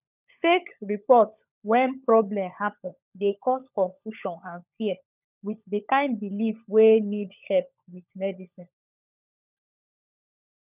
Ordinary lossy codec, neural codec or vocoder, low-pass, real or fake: none; codec, 16 kHz, 16 kbps, FunCodec, trained on LibriTTS, 50 frames a second; 3.6 kHz; fake